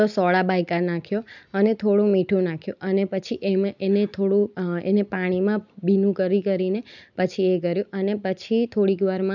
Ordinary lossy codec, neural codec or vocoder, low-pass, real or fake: none; none; 7.2 kHz; real